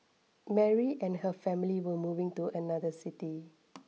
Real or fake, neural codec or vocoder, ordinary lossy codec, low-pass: real; none; none; none